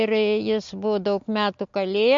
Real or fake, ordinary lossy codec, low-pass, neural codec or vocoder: real; MP3, 48 kbps; 7.2 kHz; none